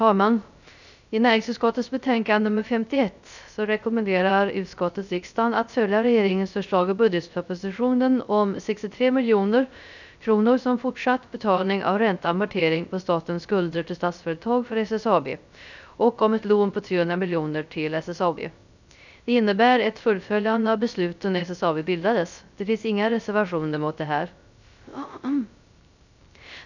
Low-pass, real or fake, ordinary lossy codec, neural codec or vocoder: 7.2 kHz; fake; none; codec, 16 kHz, 0.3 kbps, FocalCodec